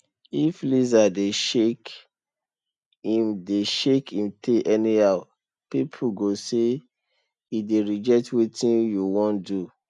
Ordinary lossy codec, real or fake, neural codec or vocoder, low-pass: none; real; none; 10.8 kHz